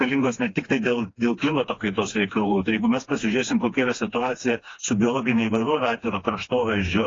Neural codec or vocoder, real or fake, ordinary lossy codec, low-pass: codec, 16 kHz, 2 kbps, FreqCodec, smaller model; fake; AAC, 32 kbps; 7.2 kHz